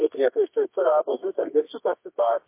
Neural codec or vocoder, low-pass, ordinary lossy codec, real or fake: codec, 24 kHz, 0.9 kbps, WavTokenizer, medium music audio release; 3.6 kHz; MP3, 24 kbps; fake